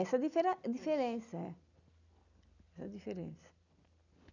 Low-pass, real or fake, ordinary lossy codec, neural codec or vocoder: 7.2 kHz; real; none; none